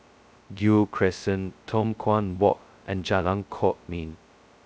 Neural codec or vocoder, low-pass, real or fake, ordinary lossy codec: codec, 16 kHz, 0.2 kbps, FocalCodec; none; fake; none